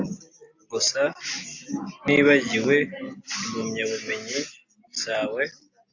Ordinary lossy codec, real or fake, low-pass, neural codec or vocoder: AAC, 48 kbps; real; 7.2 kHz; none